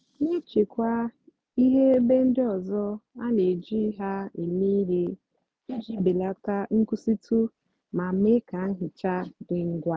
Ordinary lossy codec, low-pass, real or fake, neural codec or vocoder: none; none; real; none